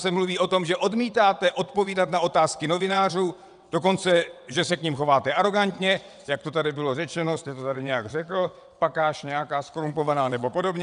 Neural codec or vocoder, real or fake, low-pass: vocoder, 22.05 kHz, 80 mel bands, WaveNeXt; fake; 9.9 kHz